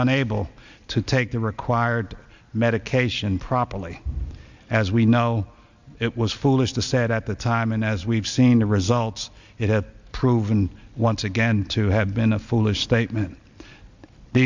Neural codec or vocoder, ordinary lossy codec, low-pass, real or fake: none; Opus, 64 kbps; 7.2 kHz; real